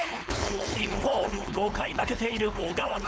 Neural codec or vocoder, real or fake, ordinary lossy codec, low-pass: codec, 16 kHz, 4.8 kbps, FACodec; fake; none; none